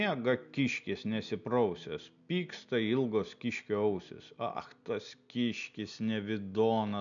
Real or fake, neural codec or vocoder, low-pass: real; none; 7.2 kHz